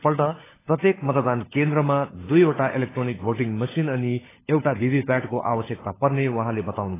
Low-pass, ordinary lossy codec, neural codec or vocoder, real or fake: 3.6 kHz; AAC, 16 kbps; codec, 16 kHz, 16 kbps, FunCodec, trained on LibriTTS, 50 frames a second; fake